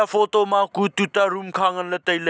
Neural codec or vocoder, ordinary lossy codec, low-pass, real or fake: none; none; none; real